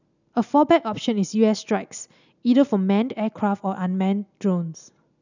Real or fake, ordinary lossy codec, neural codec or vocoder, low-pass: real; none; none; 7.2 kHz